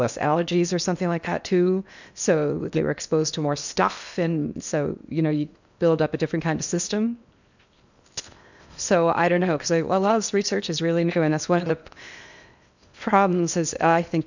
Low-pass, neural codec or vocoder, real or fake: 7.2 kHz; codec, 16 kHz in and 24 kHz out, 0.8 kbps, FocalCodec, streaming, 65536 codes; fake